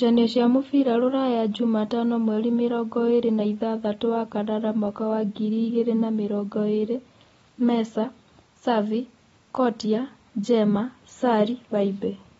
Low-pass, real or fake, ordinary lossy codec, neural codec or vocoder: 9.9 kHz; real; AAC, 24 kbps; none